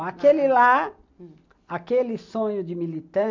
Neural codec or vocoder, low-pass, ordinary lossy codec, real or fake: none; 7.2 kHz; MP3, 64 kbps; real